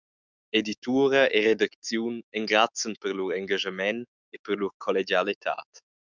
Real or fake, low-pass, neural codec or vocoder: fake; 7.2 kHz; autoencoder, 48 kHz, 128 numbers a frame, DAC-VAE, trained on Japanese speech